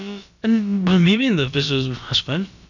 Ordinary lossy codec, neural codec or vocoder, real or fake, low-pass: none; codec, 16 kHz, about 1 kbps, DyCAST, with the encoder's durations; fake; 7.2 kHz